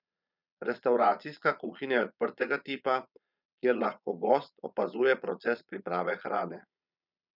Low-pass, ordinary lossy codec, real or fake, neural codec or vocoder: 5.4 kHz; none; fake; vocoder, 22.05 kHz, 80 mel bands, Vocos